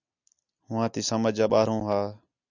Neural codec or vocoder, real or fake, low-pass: none; real; 7.2 kHz